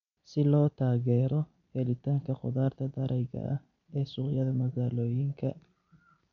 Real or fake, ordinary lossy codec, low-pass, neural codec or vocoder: real; MP3, 64 kbps; 7.2 kHz; none